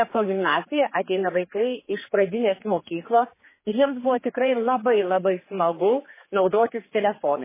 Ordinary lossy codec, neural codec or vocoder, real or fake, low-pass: MP3, 16 kbps; codec, 16 kHz, 4 kbps, X-Codec, HuBERT features, trained on general audio; fake; 3.6 kHz